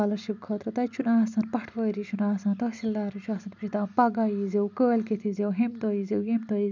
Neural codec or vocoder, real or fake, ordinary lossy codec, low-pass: none; real; none; 7.2 kHz